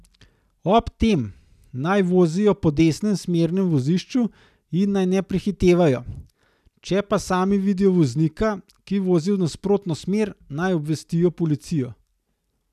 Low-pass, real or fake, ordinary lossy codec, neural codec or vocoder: 14.4 kHz; real; none; none